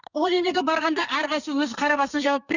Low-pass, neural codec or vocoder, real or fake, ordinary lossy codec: 7.2 kHz; codec, 32 kHz, 1.9 kbps, SNAC; fake; none